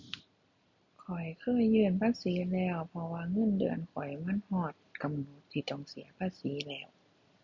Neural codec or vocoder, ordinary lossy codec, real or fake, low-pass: none; none; real; 7.2 kHz